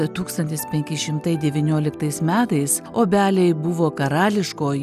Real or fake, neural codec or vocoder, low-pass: real; none; 14.4 kHz